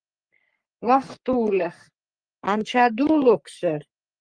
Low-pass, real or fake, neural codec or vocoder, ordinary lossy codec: 9.9 kHz; fake; codec, 44.1 kHz, 3.4 kbps, Pupu-Codec; Opus, 32 kbps